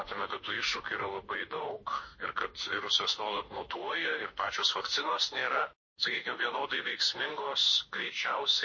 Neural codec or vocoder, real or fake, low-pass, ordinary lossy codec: autoencoder, 48 kHz, 32 numbers a frame, DAC-VAE, trained on Japanese speech; fake; 7.2 kHz; MP3, 32 kbps